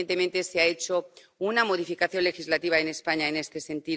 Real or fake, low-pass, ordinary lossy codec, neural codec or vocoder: real; none; none; none